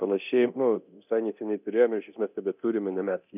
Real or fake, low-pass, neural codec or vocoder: fake; 3.6 kHz; codec, 24 kHz, 0.9 kbps, DualCodec